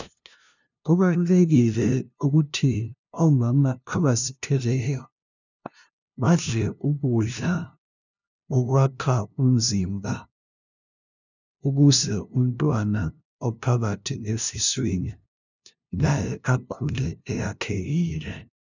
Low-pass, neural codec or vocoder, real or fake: 7.2 kHz; codec, 16 kHz, 0.5 kbps, FunCodec, trained on LibriTTS, 25 frames a second; fake